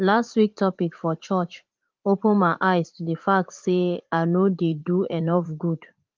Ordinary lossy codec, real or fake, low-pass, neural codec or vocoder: Opus, 32 kbps; fake; 7.2 kHz; vocoder, 44.1 kHz, 80 mel bands, Vocos